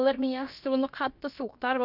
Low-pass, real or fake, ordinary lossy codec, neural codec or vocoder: 5.4 kHz; fake; none; codec, 16 kHz, about 1 kbps, DyCAST, with the encoder's durations